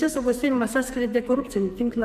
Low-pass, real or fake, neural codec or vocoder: 14.4 kHz; fake; codec, 44.1 kHz, 2.6 kbps, SNAC